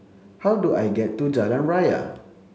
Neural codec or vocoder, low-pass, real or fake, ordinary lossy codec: none; none; real; none